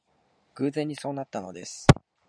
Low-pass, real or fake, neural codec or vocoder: 9.9 kHz; real; none